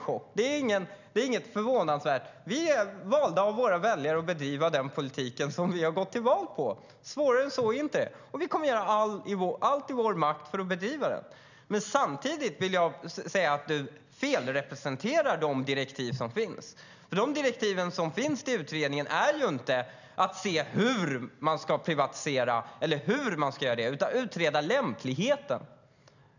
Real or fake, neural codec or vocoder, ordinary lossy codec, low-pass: real; none; none; 7.2 kHz